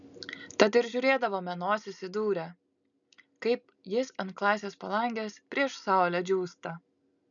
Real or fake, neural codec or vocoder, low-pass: real; none; 7.2 kHz